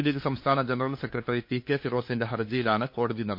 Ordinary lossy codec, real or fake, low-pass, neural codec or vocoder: MP3, 32 kbps; fake; 5.4 kHz; codec, 16 kHz, 2 kbps, FunCodec, trained on LibriTTS, 25 frames a second